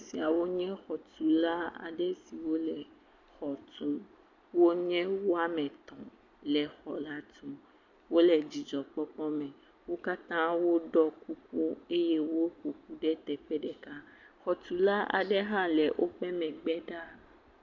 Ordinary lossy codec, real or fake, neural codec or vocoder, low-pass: MP3, 64 kbps; real; none; 7.2 kHz